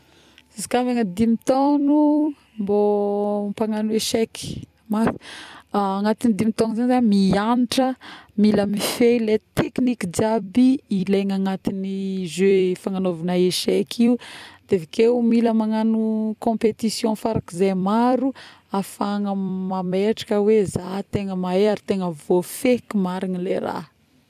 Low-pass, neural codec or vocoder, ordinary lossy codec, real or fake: 14.4 kHz; vocoder, 44.1 kHz, 128 mel bands every 256 samples, BigVGAN v2; none; fake